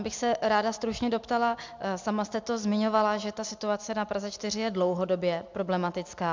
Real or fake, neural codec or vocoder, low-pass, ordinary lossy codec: real; none; 7.2 kHz; MP3, 64 kbps